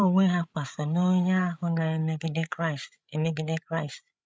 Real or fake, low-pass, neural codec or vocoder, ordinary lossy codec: fake; none; codec, 16 kHz, 8 kbps, FreqCodec, larger model; none